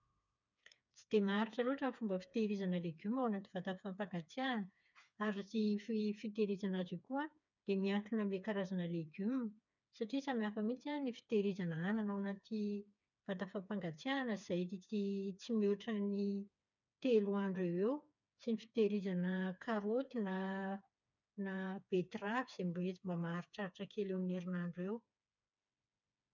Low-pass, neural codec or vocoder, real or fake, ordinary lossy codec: 7.2 kHz; codec, 16 kHz, 4 kbps, FreqCodec, smaller model; fake; none